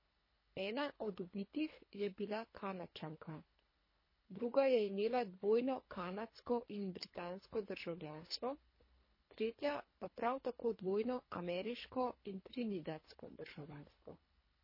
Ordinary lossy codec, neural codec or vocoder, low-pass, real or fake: MP3, 24 kbps; codec, 24 kHz, 3 kbps, HILCodec; 5.4 kHz; fake